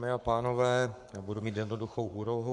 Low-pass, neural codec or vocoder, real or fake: 10.8 kHz; codec, 44.1 kHz, 7.8 kbps, Pupu-Codec; fake